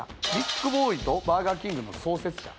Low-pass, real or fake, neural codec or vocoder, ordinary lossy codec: none; real; none; none